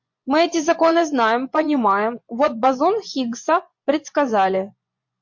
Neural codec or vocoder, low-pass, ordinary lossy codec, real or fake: vocoder, 24 kHz, 100 mel bands, Vocos; 7.2 kHz; MP3, 48 kbps; fake